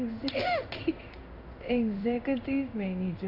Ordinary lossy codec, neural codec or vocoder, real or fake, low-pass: none; codec, 16 kHz in and 24 kHz out, 1 kbps, XY-Tokenizer; fake; 5.4 kHz